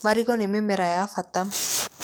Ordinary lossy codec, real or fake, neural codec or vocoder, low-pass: none; fake; codec, 44.1 kHz, 7.8 kbps, DAC; none